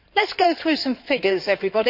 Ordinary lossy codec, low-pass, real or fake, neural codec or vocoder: none; 5.4 kHz; fake; codec, 16 kHz in and 24 kHz out, 2.2 kbps, FireRedTTS-2 codec